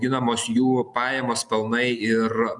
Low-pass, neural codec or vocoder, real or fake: 10.8 kHz; none; real